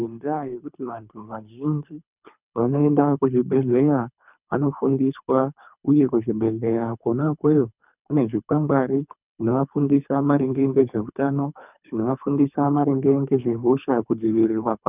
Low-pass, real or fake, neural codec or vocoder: 3.6 kHz; fake; codec, 24 kHz, 3 kbps, HILCodec